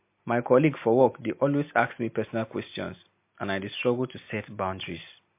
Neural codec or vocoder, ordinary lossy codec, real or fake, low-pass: none; MP3, 24 kbps; real; 3.6 kHz